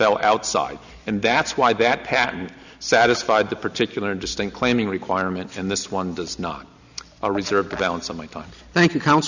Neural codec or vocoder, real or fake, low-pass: none; real; 7.2 kHz